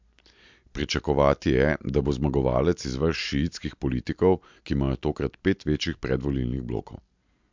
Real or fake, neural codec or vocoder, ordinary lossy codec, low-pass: real; none; MP3, 64 kbps; 7.2 kHz